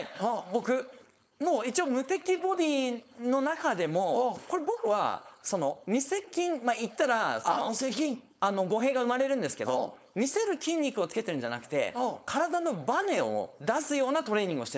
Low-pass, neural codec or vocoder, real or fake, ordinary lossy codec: none; codec, 16 kHz, 4.8 kbps, FACodec; fake; none